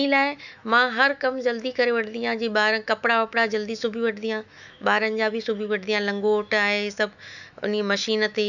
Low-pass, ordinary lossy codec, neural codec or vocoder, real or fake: 7.2 kHz; none; autoencoder, 48 kHz, 128 numbers a frame, DAC-VAE, trained on Japanese speech; fake